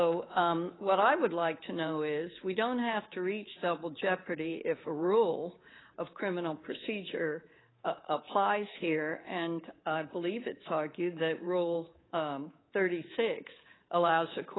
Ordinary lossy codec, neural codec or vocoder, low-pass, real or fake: AAC, 16 kbps; codec, 24 kHz, 3.1 kbps, DualCodec; 7.2 kHz; fake